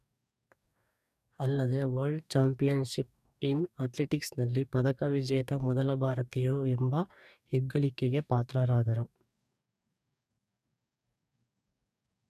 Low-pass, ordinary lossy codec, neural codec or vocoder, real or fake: 14.4 kHz; none; codec, 44.1 kHz, 2.6 kbps, DAC; fake